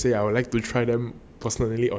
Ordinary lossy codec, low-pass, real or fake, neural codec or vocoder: none; none; real; none